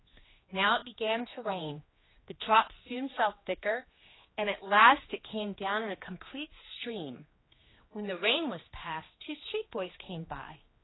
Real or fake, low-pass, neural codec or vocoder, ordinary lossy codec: fake; 7.2 kHz; codec, 16 kHz, 2 kbps, X-Codec, HuBERT features, trained on general audio; AAC, 16 kbps